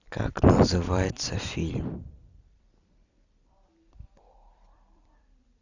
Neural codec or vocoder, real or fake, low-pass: vocoder, 44.1 kHz, 128 mel bands every 256 samples, BigVGAN v2; fake; 7.2 kHz